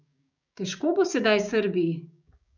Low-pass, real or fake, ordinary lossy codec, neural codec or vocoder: 7.2 kHz; fake; none; codec, 16 kHz, 6 kbps, DAC